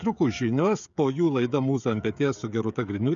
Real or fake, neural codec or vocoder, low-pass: fake; codec, 16 kHz, 16 kbps, FreqCodec, smaller model; 7.2 kHz